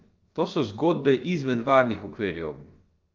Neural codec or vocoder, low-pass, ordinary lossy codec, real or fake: codec, 16 kHz, about 1 kbps, DyCAST, with the encoder's durations; 7.2 kHz; Opus, 24 kbps; fake